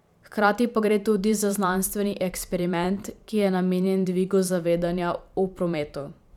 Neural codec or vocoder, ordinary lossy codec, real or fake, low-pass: vocoder, 44.1 kHz, 128 mel bands every 512 samples, BigVGAN v2; none; fake; 19.8 kHz